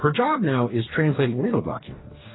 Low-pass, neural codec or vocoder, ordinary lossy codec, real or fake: 7.2 kHz; codec, 44.1 kHz, 2.6 kbps, DAC; AAC, 16 kbps; fake